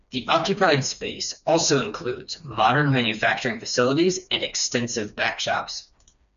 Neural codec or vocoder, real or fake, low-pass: codec, 16 kHz, 2 kbps, FreqCodec, smaller model; fake; 7.2 kHz